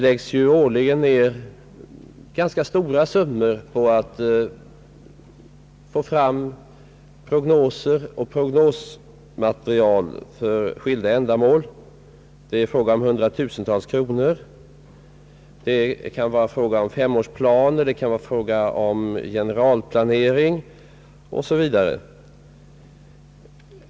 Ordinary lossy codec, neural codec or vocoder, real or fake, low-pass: none; none; real; none